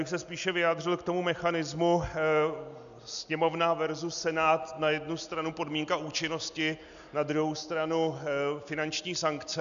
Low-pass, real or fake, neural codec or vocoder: 7.2 kHz; real; none